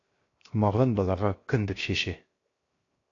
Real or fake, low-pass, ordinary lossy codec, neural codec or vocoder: fake; 7.2 kHz; AAC, 32 kbps; codec, 16 kHz, 0.7 kbps, FocalCodec